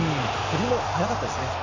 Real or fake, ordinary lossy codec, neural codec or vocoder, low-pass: real; none; none; 7.2 kHz